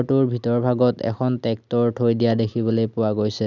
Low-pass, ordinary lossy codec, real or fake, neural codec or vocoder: 7.2 kHz; none; real; none